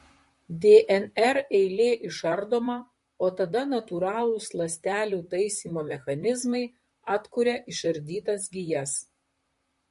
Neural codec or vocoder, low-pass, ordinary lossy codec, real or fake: vocoder, 44.1 kHz, 128 mel bands, Pupu-Vocoder; 14.4 kHz; MP3, 48 kbps; fake